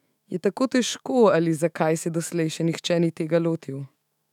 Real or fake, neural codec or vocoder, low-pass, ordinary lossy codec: fake; autoencoder, 48 kHz, 128 numbers a frame, DAC-VAE, trained on Japanese speech; 19.8 kHz; none